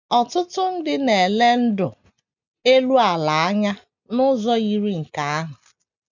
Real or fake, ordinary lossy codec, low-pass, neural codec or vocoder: real; none; 7.2 kHz; none